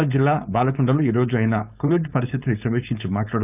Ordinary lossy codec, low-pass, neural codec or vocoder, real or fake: none; 3.6 kHz; codec, 16 kHz, 4.8 kbps, FACodec; fake